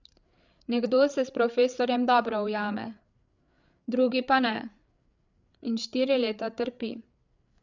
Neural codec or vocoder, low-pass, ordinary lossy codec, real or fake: codec, 16 kHz, 8 kbps, FreqCodec, larger model; 7.2 kHz; none; fake